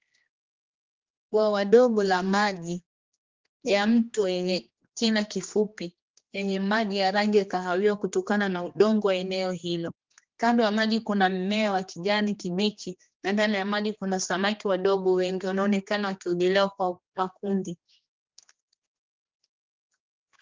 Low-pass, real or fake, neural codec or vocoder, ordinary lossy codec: 7.2 kHz; fake; codec, 16 kHz, 2 kbps, X-Codec, HuBERT features, trained on general audio; Opus, 32 kbps